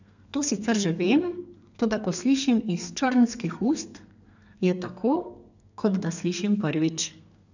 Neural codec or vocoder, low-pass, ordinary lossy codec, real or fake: codec, 32 kHz, 1.9 kbps, SNAC; 7.2 kHz; none; fake